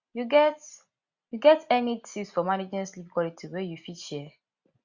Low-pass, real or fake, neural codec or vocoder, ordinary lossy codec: 7.2 kHz; real; none; Opus, 64 kbps